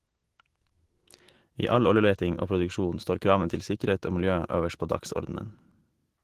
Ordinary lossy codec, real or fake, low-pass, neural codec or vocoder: Opus, 16 kbps; fake; 14.4 kHz; autoencoder, 48 kHz, 128 numbers a frame, DAC-VAE, trained on Japanese speech